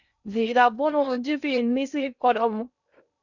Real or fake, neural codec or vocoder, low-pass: fake; codec, 16 kHz in and 24 kHz out, 0.6 kbps, FocalCodec, streaming, 2048 codes; 7.2 kHz